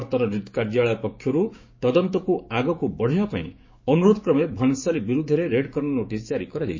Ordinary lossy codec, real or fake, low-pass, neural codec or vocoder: MP3, 32 kbps; fake; 7.2 kHz; codec, 16 kHz, 6 kbps, DAC